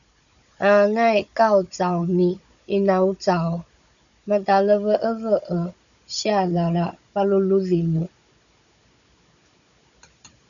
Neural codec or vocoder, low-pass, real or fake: codec, 16 kHz, 16 kbps, FunCodec, trained on Chinese and English, 50 frames a second; 7.2 kHz; fake